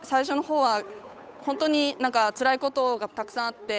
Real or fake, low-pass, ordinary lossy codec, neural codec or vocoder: fake; none; none; codec, 16 kHz, 8 kbps, FunCodec, trained on Chinese and English, 25 frames a second